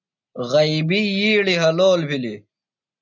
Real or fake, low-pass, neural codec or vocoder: real; 7.2 kHz; none